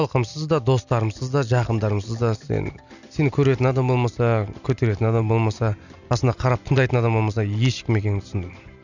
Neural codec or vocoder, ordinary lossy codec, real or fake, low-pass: none; none; real; 7.2 kHz